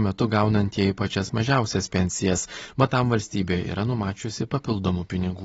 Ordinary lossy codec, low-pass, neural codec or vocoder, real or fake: AAC, 24 kbps; 19.8 kHz; none; real